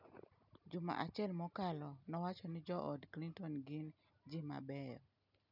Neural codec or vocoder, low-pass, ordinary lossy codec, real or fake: none; 5.4 kHz; none; real